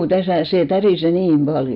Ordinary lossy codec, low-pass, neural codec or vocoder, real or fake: none; 5.4 kHz; none; real